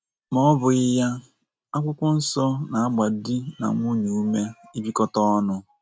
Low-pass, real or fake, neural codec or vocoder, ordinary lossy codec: none; real; none; none